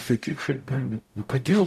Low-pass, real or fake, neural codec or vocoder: 14.4 kHz; fake; codec, 44.1 kHz, 0.9 kbps, DAC